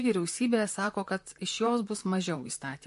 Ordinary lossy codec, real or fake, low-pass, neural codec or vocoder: MP3, 48 kbps; fake; 14.4 kHz; vocoder, 44.1 kHz, 128 mel bands, Pupu-Vocoder